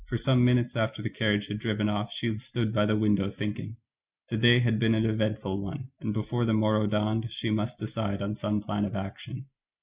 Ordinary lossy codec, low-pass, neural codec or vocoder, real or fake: Opus, 64 kbps; 3.6 kHz; none; real